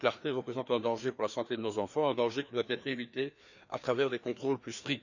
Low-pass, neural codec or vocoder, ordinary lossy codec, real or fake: 7.2 kHz; codec, 16 kHz, 2 kbps, FreqCodec, larger model; none; fake